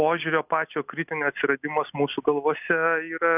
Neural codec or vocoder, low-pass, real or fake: none; 3.6 kHz; real